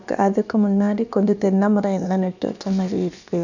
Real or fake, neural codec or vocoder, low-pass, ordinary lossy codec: fake; codec, 16 kHz, about 1 kbps, DyCAST, with the encoder's durations; 7.2 kHz; none